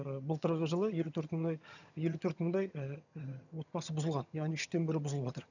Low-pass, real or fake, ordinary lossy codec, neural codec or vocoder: 7.2 kHz; fake; none; vocoder, 22.05 kHz, 80 mel bands, HiFi-GAN